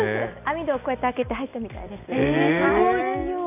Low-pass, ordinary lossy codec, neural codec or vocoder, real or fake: 3.6 kHz; none; none; real